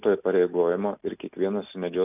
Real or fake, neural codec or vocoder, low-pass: real; none; 3.6 kHz